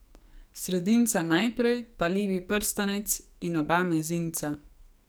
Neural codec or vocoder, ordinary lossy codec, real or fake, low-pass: codec, 44.1 kHz, 2.6 kbps, SNAC; none; fake; none